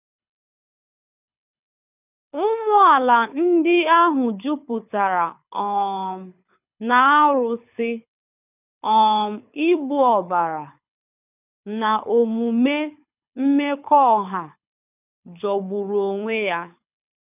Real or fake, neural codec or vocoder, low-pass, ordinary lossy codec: fake; codec, 24 kHz, 6 kbps, HILCodec; 3.6 kHz; none